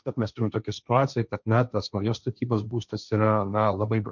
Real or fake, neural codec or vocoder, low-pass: fake; codec, 16 kHz, 1.1 kbps, Voila-Tokenizer; 7.2 kHz